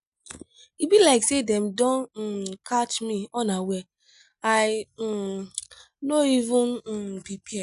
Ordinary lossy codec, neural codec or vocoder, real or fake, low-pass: none; none; real; 10.8 kHz